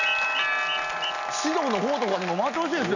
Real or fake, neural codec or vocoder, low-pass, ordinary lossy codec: real; none; 7.2 kHz; none